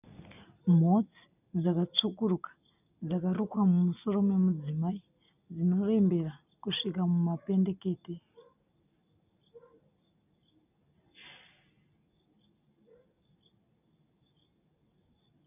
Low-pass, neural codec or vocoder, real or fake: 3.6 kHz; none; real